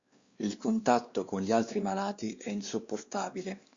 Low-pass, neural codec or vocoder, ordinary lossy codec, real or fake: 7.2 kHz; codec, 16 kHz, 2 kbps, X-Codec, WavLM features, trained on Multilingual LibriSpeech; Opus, 64 kbps; fake